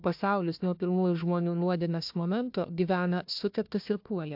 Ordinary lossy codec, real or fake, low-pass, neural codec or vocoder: AAC, 48 kbps; fake; 5.4 kHz; codec, 16 kHz, 1 kbps, FunCodec, trained on Chinese and English, 50 frames a second